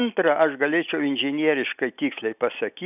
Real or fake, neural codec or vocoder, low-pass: real; none; 3.6 kHz